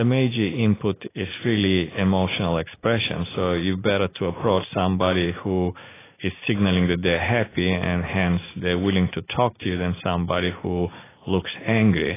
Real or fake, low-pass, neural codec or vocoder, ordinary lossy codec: real; 3.6 kHz; none; AAC, 16 kbps